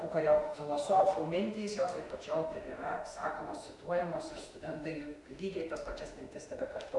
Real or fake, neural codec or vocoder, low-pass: fake; autoencoder, 48 kHz, 32 numbers a frame, DAC-VAE, trained on Japanese speech; 10.8 kHz